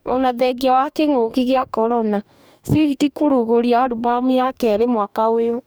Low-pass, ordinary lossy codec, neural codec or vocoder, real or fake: none; none; codec, 44.1 kHz, 2.6 kbps, DAC; fake